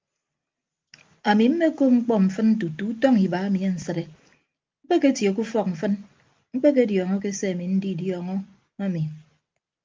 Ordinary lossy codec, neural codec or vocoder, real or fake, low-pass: Opus, 32 kbps; none; real; 7.2 kHz